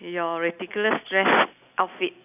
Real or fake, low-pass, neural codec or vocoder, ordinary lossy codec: real; 3.6 kHz; none; none